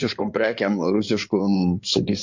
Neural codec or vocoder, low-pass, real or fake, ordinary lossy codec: codec, 16 kHz in and 24 kHz out, 2.2 kbps, FireRedTTS-2 codec; 7.2 kHz; fake; MP3, 48 kbps